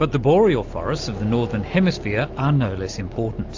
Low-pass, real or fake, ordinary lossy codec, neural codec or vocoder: 7.2 kHz; real; AAC, 48 kbps; none